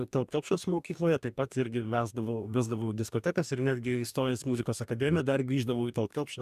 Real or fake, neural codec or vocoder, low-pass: fake; codec, 44.1 kHz, 2.6 kbps, DAC; 14.4 kHz